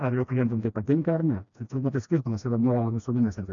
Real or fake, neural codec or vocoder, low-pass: fake; codec, 16 kHz, 1 kbps, FreqCodec, smaller model; 7.2 kHz